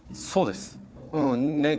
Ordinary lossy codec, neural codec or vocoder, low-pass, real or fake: none; codec, 16 kHz, 4 kbps, FunCodec, trained on Chinese and English, 50 frames a second; none; fake